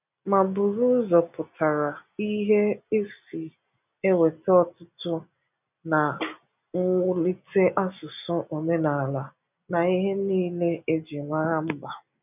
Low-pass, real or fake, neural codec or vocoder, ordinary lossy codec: 3.6 kHz; real; none; none